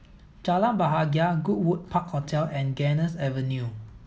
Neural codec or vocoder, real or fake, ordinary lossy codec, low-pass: none; real; none; none